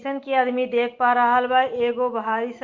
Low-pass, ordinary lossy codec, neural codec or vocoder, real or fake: 7.2 kHz; Opus, 24 kbps; none; real